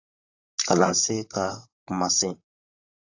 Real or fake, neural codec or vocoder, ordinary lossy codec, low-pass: fake; vocoder, 44.1 kHz, 128 mel bands, Pupu-Vocoder; AAC, 48 kbps; 7.2 kHz